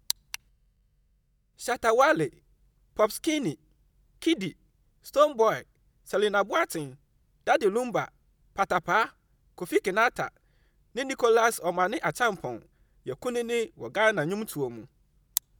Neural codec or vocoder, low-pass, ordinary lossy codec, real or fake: none; none; none; real